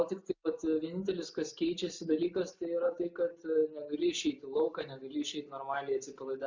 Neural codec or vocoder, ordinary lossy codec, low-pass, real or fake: none; MP3, 64 kbps; 7.2 kHz; real